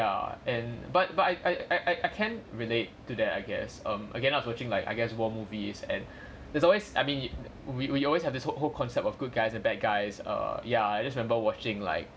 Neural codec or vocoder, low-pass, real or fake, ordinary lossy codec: none; none; real; none